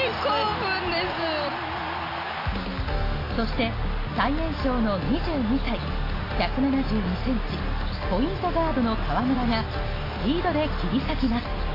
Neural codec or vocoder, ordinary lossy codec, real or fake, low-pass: none; AAC, 24 kbps; real; 5.4 kHz